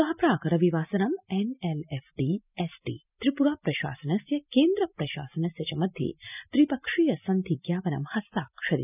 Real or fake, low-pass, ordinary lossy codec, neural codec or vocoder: real; 3.6 kHz; none; none